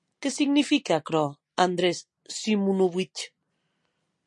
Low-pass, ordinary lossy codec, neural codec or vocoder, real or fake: 10.8 kHz; MP3, 48 kbps; none; real